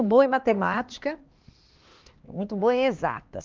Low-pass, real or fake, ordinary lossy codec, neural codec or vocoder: 7.2 kHz; fake; Opus, 32 kbps; codec, 16 kHz, 2 kbps, X-Codec, HuBERT features, trained on LibriSpeech